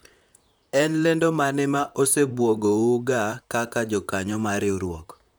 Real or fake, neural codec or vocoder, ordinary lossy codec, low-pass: fake; vocoder, 44.1 kHz, 128 mel bands, Pupu-Vocoder; none; none